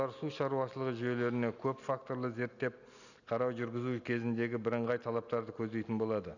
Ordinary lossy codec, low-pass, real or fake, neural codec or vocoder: none; 7.2 kHz; real; none